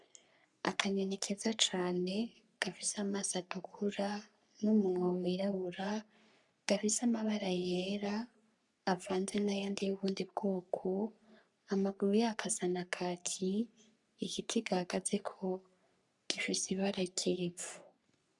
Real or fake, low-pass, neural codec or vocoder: fake; 10.8 kHz; codec, 44.1 kHz, 3.4 kbps, Pupu-Codec